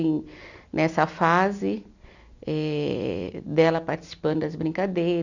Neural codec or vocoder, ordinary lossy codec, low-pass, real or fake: none; none; 7.2 kHz; real